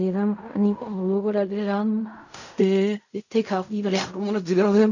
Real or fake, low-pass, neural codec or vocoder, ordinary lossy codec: fake; 7.2 kHz; codec, 16 kHz in and 24 kHz out, 0.4 kbps, LongCat-Audio-Codec, fine tuned four codebook decoder; none